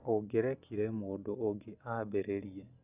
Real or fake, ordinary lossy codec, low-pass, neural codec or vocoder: real; Opus, 64 kbps; 3.6 kHz; none